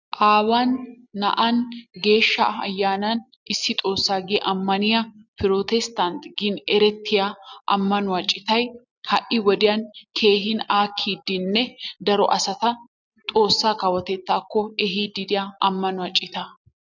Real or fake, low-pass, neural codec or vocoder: real; 7.2 kHz; none